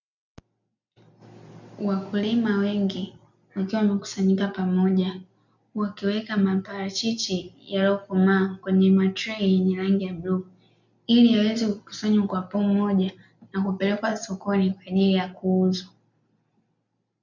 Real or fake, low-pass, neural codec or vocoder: real; 7.2 kHz; none